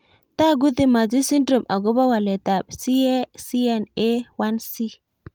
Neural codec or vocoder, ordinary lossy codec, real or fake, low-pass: none; Opus, 32 kbps; real; 19.8 kHz